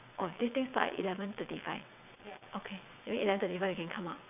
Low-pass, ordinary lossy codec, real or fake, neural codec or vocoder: 3.6 kHz; none; fake; vocoder, 22.05 kHz, 80 mel bands, WaveNeXt